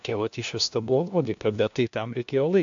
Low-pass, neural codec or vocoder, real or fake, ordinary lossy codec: 7.2 kHz; codec, 16 kHz, 1 kbps, FunCodec, trained on LibriTTS, 50 frames a second; fake; AAC, 64 kbps